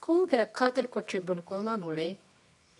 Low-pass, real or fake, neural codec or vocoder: 10.8 kHz; fake; codec, 24 kHz, 0.9 kbps, WavTokenizer, medium music audio release